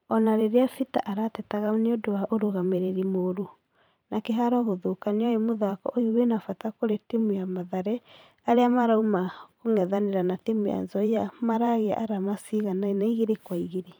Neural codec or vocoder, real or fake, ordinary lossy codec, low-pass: vocoder, 44.1 kHz, 128 mel bands every 512 samples, BigVGAN v2; fake; none; none